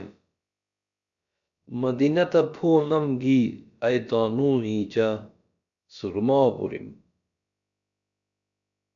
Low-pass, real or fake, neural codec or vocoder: 7.2 kHz; fake; codec, 16 kHz, about 1 kbps, DyCAST, with the encoder's durations